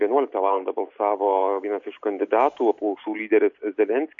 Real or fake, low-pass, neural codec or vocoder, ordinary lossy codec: real; 7.2 kHz; none; MP3, 48 kbps